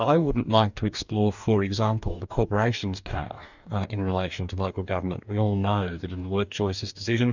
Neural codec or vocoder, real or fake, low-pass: codec, 44.1 kHz, 2.6 kbps, DAC; fake; 7.2 kHz